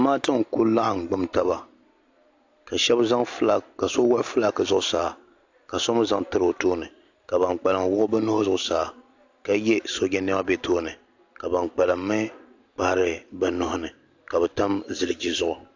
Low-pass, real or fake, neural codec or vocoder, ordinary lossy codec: 7.2 kHz; real; none; AAC, 48 kbps